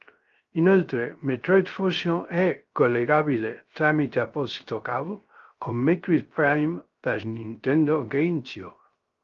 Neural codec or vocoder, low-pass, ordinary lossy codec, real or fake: codec, 16 kHz, 0.3 kbps, FocalCodec; 7.2 kHz; Opus, 32 kbps; fake